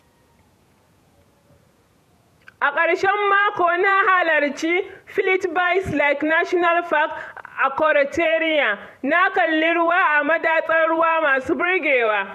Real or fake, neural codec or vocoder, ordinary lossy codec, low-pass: fake; vocoder, 48 kHz, 128 mel bands, Vocos; none; 14.4 kHz